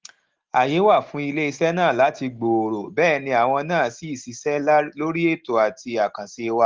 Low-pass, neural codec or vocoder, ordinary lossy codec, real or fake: 7.2 kHz; none; Opus, 16 kbps; real